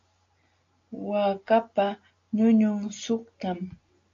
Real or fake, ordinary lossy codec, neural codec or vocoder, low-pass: real; AAC, 48 kbps; none; 7.2 kHz